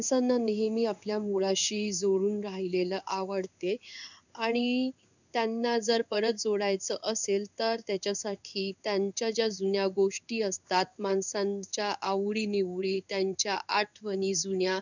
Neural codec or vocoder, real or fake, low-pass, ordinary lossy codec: codec, 16 kHz in and 24 kHz out, 1 kbps, XY-Tokenizer; fake; 7.2 kHz; none